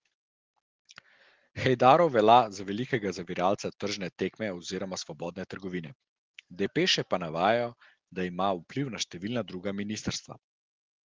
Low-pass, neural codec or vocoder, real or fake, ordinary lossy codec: 7.2 kHz; none; real; Opus, 16 kbps